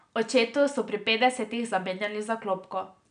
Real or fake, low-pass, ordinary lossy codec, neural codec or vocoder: real; 9.9 kHz; none; none